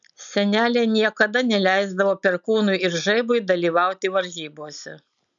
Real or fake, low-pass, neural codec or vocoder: real; 7.2 kHz; none